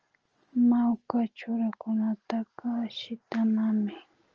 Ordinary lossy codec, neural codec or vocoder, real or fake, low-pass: Opus, 24 kbps; none; real; 7.2 kHz